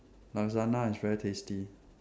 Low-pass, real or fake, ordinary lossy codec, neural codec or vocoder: none; real; none; none